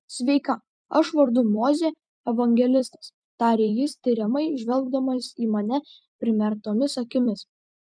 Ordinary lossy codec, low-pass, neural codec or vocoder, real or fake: MP3, 96 kbps; 9.9 kHz; vocoder, 44.1 kHz, 128 mel bands every 256 samples, BigVGAN v2; fake